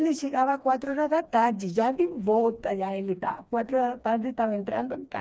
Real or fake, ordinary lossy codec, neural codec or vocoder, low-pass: fake; none; codec, 16 kHz, 2 kbps, FreqCodec, smaller model; none